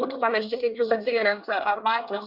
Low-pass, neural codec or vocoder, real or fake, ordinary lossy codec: 5.4 kHz; codec, 24 kHz, 1 kbps, SNAC; fake; Opus, 64 kbps